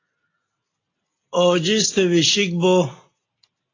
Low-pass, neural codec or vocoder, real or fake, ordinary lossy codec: 7.2 kHz; none; real; AAC, 32 kbps